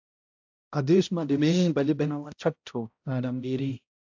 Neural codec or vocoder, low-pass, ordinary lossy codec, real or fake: codec, 16 kHz, 0.5 kbps, X-Codec, HuBERT features, trained on balanced general audio; 7.2 kHz; AAC, 48 kbps; fake